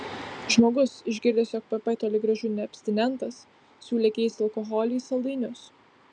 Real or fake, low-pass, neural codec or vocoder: real; 9.9 kHz; none